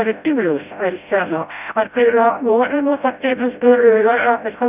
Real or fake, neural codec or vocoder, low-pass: fake; codec, 16 kHz, 0.5 kbps, FreqCodec, smaller model; 3.6 kHz